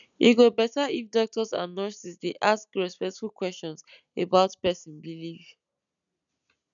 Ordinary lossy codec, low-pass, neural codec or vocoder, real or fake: none; 7.2 kHz; none; real